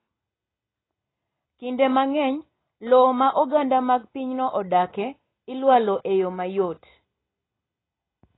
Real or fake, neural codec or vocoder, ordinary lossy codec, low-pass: real; none; AAC, 16 kbps; 7.2 kHz